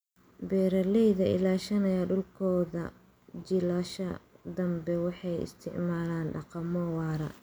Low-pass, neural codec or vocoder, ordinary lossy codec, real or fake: none; none; none; real